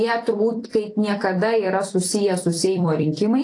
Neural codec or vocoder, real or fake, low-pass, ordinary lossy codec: vocoder, 44.1 kHz, 128 mel bands every 256 samples, BigVGAN v2; fake; 10.8 kHz; AAC, 48 kbps